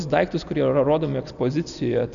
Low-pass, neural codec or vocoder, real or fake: 7.2 kHz; none; real